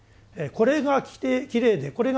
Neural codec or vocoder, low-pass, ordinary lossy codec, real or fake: none; none; none; real